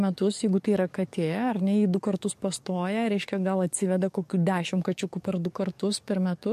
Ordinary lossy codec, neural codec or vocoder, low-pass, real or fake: MP3, 64 kbps; codec, 44.1 kHz, 7.8 kbps, DAC; 14.4 kHz; fake